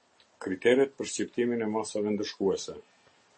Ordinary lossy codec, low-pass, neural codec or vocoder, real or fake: MP3, 32 kbps; 10.8 kHz; none; real